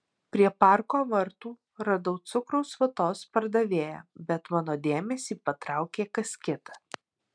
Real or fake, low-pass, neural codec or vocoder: real; 9.9 kHz; none